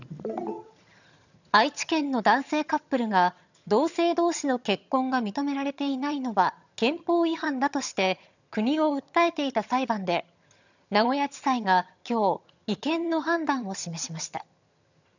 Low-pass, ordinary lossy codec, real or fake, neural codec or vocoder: 7.2 kHz; none; fake; vocoder, 22.05 kHz, 80 mel bands, HiFi-GAN